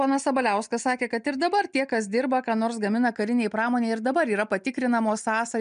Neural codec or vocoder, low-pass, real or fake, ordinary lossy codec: none; 9.9 kHz; real; MP3, 96 kbps